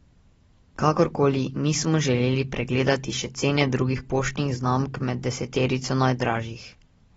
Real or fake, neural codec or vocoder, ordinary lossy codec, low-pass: real; none; AAC, 24 kbps; 19.8 kHz